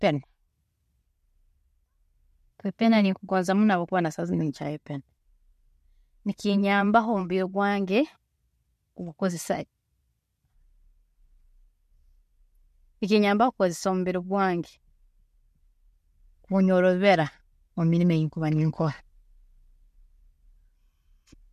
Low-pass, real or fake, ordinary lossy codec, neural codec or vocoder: 14.4 kHz; real; MP3, 64 kbps; none